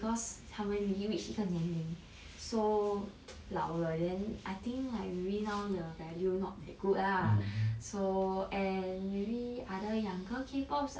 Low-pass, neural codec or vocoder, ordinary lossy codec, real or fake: none; none; none; real